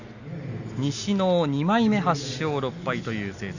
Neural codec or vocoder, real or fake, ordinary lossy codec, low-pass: none; real; none; 7.2 kHz